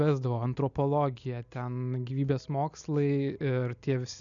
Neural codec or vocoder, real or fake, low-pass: none; real; 7.2 kHz